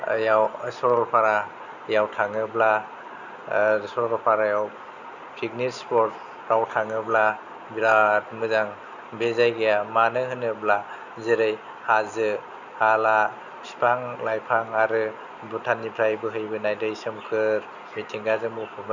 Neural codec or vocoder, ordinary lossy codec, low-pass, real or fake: none; none; 7.2 kHz; real